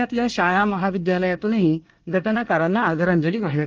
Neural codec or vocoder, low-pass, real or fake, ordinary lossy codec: codec, 24 kHz, 1 kbps, SNAC; 7.2 kHz; fake; Opus, 24 kbps